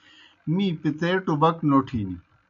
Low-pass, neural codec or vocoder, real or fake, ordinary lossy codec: 7.2 kHz; none; real; MP3, 64 kbps